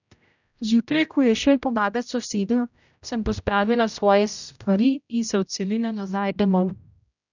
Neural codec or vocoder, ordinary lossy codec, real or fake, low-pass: codec, 16 kHz, 0.5 kbps, X-Codec, HuBERT features, trained on general audio; none; fake; 7.2 kHz